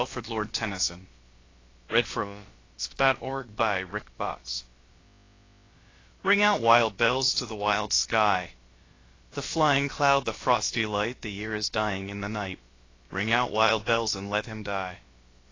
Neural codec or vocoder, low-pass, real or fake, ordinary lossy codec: codec, 16 kHz, about 1 kbps, DyCAST, with the encoder's durations; 7.2 kHz; fake; AAC, 32 kbps